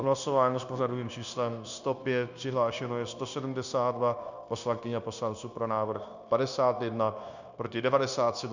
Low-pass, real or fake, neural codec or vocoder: 7.2 kHz; fake; codec, 16 kHz, 0.9 kbps, LongCat-Audio-Codec